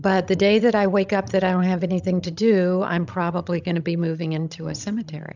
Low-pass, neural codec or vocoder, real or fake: 7.2 kHz; codec, 16 kHz, 16 kbps, FreqCodec, larger model; fake